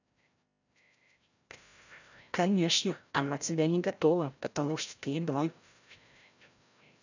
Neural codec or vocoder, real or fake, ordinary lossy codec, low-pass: codec, 16 kHz, 0.5 kbps, FreqCodec, larger model; fake; none; 7.2 kHz